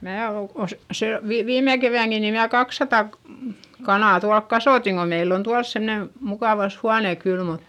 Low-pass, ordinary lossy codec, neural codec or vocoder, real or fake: 19.8 kHz; none; none; real